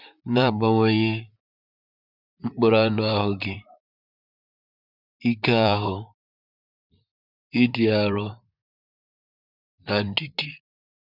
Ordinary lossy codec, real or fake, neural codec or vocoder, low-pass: none; fake; vocoder, 44.1 kHz, 128 mel bands, Pupu-Vocoder; 5.4 kHz